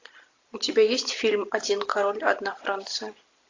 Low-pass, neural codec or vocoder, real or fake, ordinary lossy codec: 7.2 kHz; none; real; AAC, 48 kbps